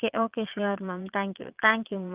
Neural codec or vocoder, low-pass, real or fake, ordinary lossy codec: vocoder, 22.05 kHz, 80 mel bands, Vocos; 3.6 kHz; fake; Opus, 32 kbps